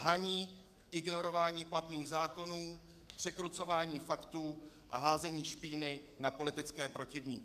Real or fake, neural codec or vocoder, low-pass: fake; codec, 44.1 kHz, 2.6 kbps, SNAC; 14.4 kHz